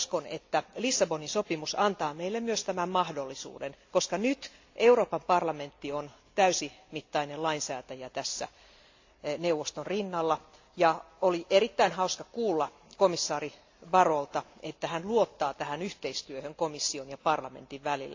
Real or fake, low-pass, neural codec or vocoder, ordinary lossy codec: real; 7.2 kHz; none; AAC, 48 kbps